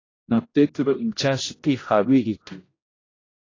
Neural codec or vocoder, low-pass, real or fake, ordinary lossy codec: codec, 16 kHz, 0.5 kbps, X-Codec, HuBERT features, trained on general audio; 7.2 kHz; fake; AAC, 32 kbps